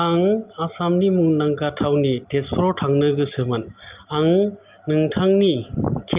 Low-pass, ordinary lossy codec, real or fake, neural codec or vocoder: 3.6 kHz; Opus, 24 kbps; real; none